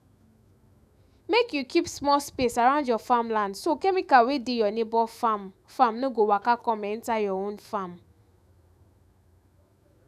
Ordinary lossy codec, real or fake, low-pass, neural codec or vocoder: none; fake; 14.4 kHz; autoencoder, 48 kHz, 128 numbers a frame, DAC-VAE, trained on Japanese speech